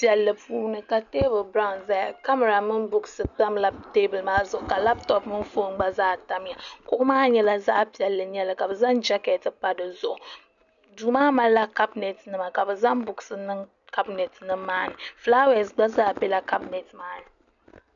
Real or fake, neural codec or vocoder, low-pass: real; none; 7.2 kHz